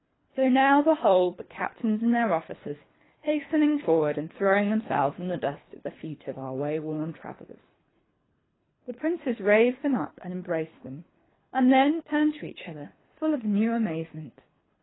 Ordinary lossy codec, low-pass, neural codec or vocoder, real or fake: AAC, 16 kbps; 7.2 kHz; codec, 24 kHz, 3 kbps, HILCodec; fake